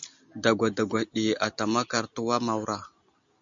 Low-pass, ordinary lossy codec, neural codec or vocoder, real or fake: 7.2 kHz; MP3, 64 kbps; none; real